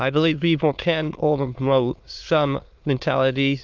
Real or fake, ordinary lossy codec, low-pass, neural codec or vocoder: fake; Opus, 24 kbps; 7.2 kHz; autoencoder, 22.05 kHz, a latent of 192 numbers a frame, VITS, trained on many speakers